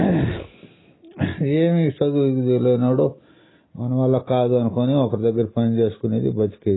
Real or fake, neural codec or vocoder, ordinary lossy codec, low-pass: real; none; AAC, 16 kbps; 7.2 kHz